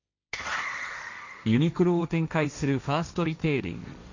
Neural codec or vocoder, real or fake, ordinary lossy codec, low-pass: codec, 16 kHz, 1.1 kbps, Voila-Tokenizer; fake; none; 7.2 kHz